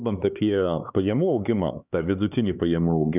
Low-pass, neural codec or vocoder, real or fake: 3.6 kHz; codec, 16 kHz, 4 kbps, X-Codec, HuBERT features, trained on LibriSpeech; fake